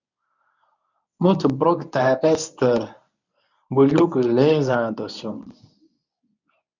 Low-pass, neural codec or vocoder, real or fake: 7.2 kHz; codec, 24 kHz, 0.9 kbps, WavTokenizer, medium speech release version 1; fake